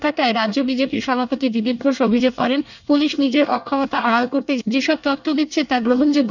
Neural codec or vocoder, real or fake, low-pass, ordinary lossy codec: codec, 24 kHz, 1 kbps, SNAC; fake; 7.2 kHz; none